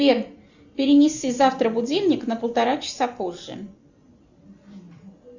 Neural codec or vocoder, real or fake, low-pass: none; real; 7.2 kHz